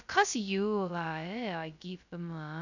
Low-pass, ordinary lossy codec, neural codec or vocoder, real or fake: 7.2 kHz; none; codec, 16 kHz, 0.2 kbps, FocalCodec; fake